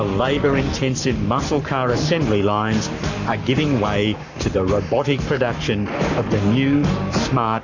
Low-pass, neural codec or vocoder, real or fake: 7.2 kHz; codec, 44.1 kHz, 7.8 kbps, Pupu-Codec; fake